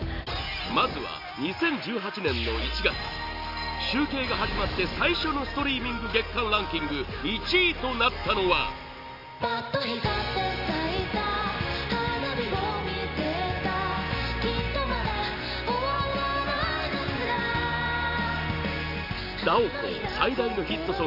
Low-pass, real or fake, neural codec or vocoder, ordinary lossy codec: 5.4 kHz; real; none; none